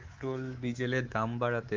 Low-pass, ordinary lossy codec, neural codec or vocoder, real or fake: 7.2 kHz; Opus, 32 kbps; codec, 24 kHz, 3.1 kbps, DualCodec; fake